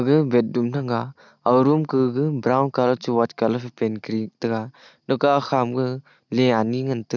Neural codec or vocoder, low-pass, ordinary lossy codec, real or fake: none; 7.2 kHz; none; real